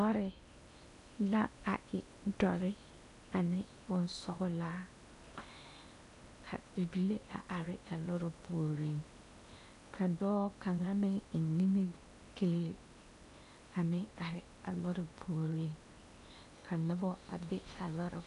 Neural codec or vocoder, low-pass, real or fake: codec, 16 kHz in and 24 kHz out, 0.8 kbps, FocalCodec, streaming, 65536 codes; 10.8 kHz; fake